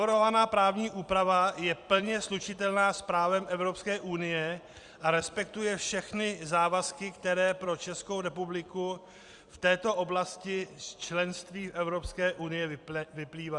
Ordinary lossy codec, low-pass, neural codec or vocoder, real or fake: Opus, 64 kbps; 10.8 kHz; vocoder, 44.1 kHz, 128 mel bands every 256 samples, BigVGAN v2; fake